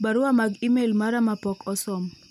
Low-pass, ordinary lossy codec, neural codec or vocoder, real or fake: 19.8 kHz; none; none; real